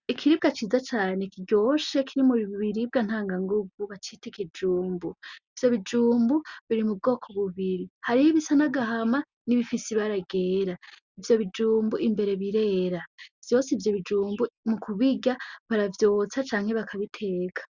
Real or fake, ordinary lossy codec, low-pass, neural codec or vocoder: real; Opus, 64 kbps; 7.2 kHz; none